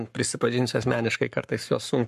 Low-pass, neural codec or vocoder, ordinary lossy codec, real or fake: 14.4 kHz; codec, 44.1 kHz, 7.8 kbps, Pupu-Codec; MP3, 64 kbps; fake